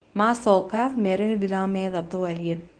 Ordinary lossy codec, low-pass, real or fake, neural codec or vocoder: Opus, 24 kbps; 9.9 kHz; fake; codec, 24 kHz, 0.9 kbps, WavTokenizer, medium speech release version 1